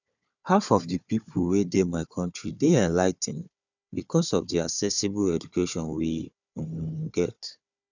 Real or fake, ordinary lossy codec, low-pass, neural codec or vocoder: fake; none; 7.2 kHz; codec, 16 kHz, 4 kbps, FunCodec, trained on Chinese and English, 50 frames a second